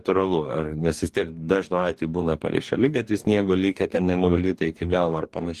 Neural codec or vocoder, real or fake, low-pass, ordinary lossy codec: codec, 44.1 kHz, 2.6 kbps, DAC; fake; 14.4 kHz; Opus, 24 kbps